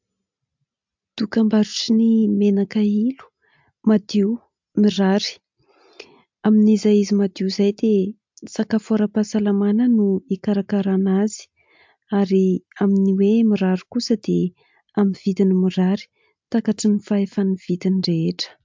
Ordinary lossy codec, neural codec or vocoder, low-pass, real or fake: MP3, 64 kbps; none; 7.2 kHz; real